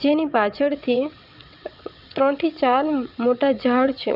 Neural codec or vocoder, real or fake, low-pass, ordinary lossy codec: vocoder, 22.05 kHz, 80 mel bands, WaveNeXt; fake; 5.4 kHz; none